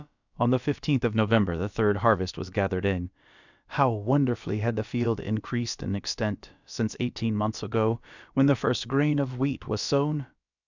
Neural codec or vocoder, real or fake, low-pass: codec, 16 kHz, about 1 kbps, DyCAST, with the encoder's durations; fake; 7.2 kHz